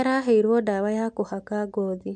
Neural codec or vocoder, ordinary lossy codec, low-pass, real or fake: none; MP3, 64 kbps; 10.8 kHz; real